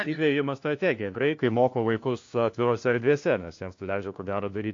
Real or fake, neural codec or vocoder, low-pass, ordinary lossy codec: fake; codec, 16 kHz, 1 kbps, FunCodec, trained on LibriTTS, 50 frames a second; 7.2 kHz; AAC, 48 kbps